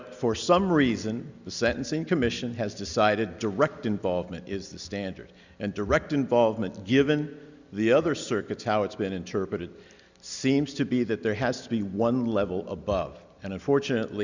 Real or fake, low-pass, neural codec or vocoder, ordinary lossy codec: real; 7.2 kHz; none; Opus, 64 kbps